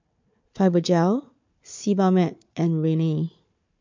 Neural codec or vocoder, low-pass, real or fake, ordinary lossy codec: codec, 16 kHz, 4 kbps, FunCodec, trained on Chinese and English, 50 frames a second; 7.2 kHz; fake; MP3, 48 kbps